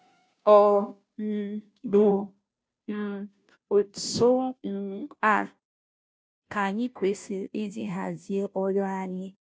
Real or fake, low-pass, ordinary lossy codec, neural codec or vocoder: fake; none; none; codec, 16 kHz, 0.5 kbps, FunCodec, trained on Chinese and English, 25 frames a second